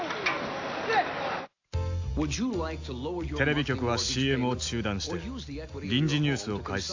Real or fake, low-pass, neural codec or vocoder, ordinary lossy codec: real; 7.2 kHz; none; AAC, 48 kbps